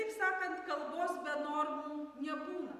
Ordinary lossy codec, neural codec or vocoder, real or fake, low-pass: Opus, 64 kbps; none; real; 14.4 kHz